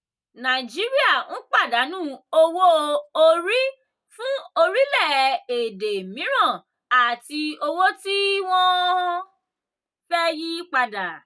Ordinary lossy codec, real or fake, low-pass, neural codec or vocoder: none; real; none; none